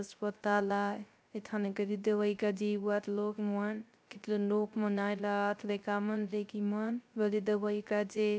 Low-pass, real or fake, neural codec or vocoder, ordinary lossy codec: none; fake; codec, 16 kHz, 0.3 kbps, FocalCodec; none